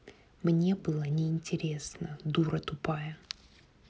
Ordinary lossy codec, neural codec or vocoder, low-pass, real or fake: none; none; none; real